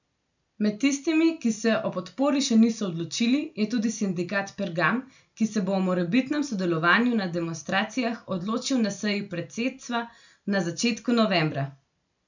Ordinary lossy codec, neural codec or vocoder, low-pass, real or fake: none; none; 7.2 kHz; real